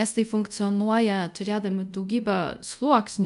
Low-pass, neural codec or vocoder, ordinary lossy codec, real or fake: 10.8 kHz; codec, 24 kHz, 0.5 kbps, DualCodec; MP3, 96 kbps; fake